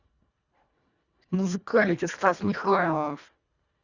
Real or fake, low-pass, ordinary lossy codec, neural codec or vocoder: fake; 7.2 kHz; Opus, 64 kbps; codec, 24 kHz, 1.5 kbps, HILCodec